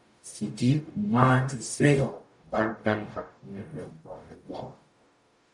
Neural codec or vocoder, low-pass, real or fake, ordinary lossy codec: codec, 44.1 kHz, 0.9 kbps, DAC; 10.8 kHz; fake; MP3, 96 kbps